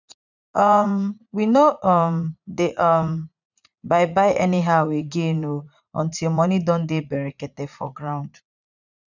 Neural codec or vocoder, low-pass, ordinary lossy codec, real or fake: vocoder, 44.1 kHz, 80 mel bands, Vocos; 7.2 kHz; none; fake